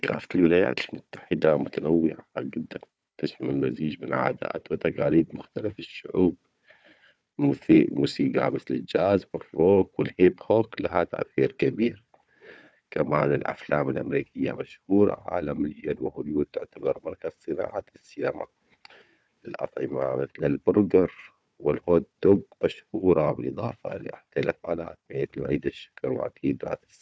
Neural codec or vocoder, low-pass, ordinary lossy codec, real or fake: codec, 16 kHz, 4 kbps, FunCodec, trained on Chinese and English, 50 frames a second; none; none; fake